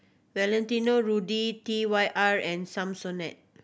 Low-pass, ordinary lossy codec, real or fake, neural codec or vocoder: none; none; real; none